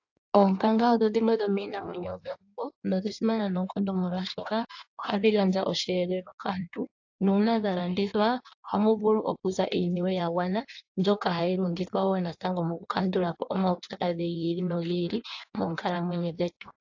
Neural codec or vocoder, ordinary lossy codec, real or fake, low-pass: codec, 16 kHz in and 24 kHz out, 1.1 kbps, FireRedTTS-2 codec; AAC, 48 kbps; fake; 7.2 kHz